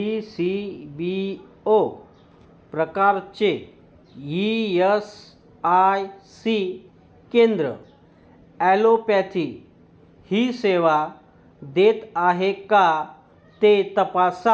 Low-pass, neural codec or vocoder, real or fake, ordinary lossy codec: none; none; real; none